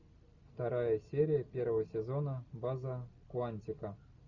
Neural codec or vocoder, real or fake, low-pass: none; real; 7.2 kHz